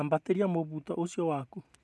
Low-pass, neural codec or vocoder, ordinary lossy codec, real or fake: none; none; none; real